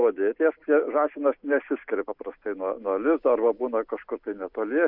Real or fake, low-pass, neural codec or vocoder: real; 5.4 kHz; none